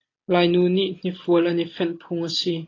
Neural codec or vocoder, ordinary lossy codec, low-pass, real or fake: none; AAC, 32 kbps; 7.2 kHz; real